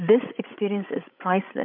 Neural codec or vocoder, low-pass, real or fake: codec, 16 kHz, 16 kbps, FreqCodec, larger model; 5.4 kHz; fake